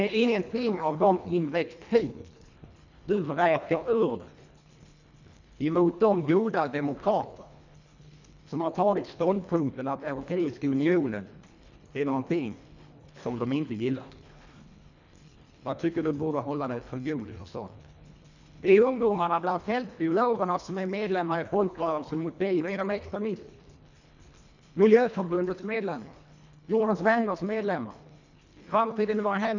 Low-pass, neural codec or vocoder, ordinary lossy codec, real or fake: 7.2 kHz; codec, 24 kHz, 1.5 kbps, HILCodec; none; fake